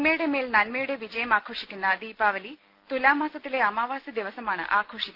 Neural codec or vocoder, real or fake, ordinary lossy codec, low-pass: none; real; Opus, 32 kbps; 5.4 kHz